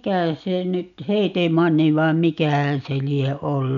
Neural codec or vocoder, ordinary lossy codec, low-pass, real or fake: none; none; 7.2 kHz; real